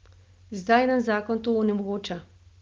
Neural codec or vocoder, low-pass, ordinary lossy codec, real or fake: none; 7.2 kHz; Opus, 32 kbps; real